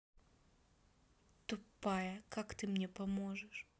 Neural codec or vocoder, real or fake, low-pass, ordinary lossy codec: none; real; none; none